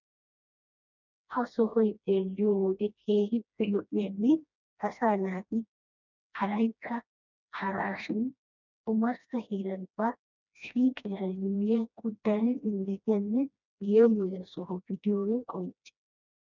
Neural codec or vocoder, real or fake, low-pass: codec, 16 kHz, 1 kbps, FreqCodec, smaller model; fake; 7.2 kHz